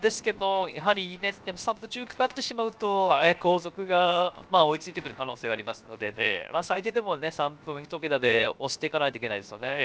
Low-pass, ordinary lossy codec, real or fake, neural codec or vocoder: none; none; fake; codec, 16 kHz, 0.7 kbps, FocalCodec